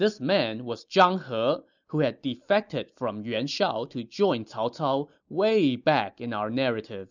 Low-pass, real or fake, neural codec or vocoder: 7.2 kHz; real; none